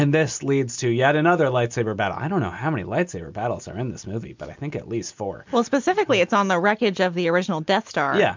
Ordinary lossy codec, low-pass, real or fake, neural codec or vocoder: MP3, 64 kbps; 7.2 kHz; real; none